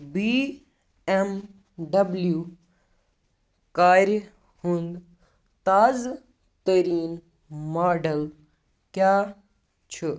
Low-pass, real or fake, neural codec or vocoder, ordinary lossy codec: none; real; none; none